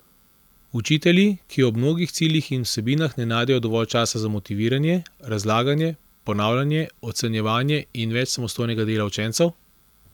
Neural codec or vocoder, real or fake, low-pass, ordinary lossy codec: none; real; 19.8 kHz; none